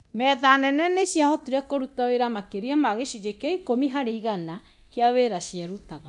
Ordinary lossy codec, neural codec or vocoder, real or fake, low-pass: none; codec, 24 kHz, 0.9 kbps, DualCodec; fake; 10.8 kHz